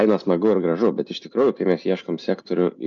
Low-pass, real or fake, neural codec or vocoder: 7.2 kHz; real; none